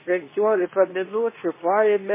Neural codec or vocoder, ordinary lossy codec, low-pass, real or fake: codec, 24 kHz, 0.9 kbps, WavTokenizer, medium speech release version 2; MP3, 16 kbps; 3.6 kHz; fake